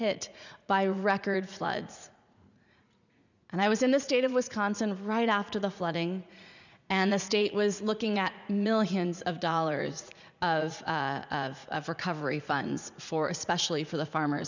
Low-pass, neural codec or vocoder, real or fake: 7.2 kHz; vocoder, 44.1 kHz, 80 mel bands, Vocos; fake